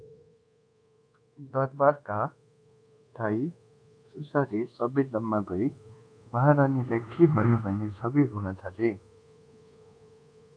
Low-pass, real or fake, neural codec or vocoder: 9.9 kHz; fake; codec, 24 kHz, 1.2 kbps, DualCodec